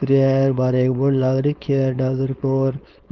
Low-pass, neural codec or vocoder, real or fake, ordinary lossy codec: 7.2 kHz; codec, 16 kHz, 4.8 kbps, FACodec; fake; Opus, 32 kbps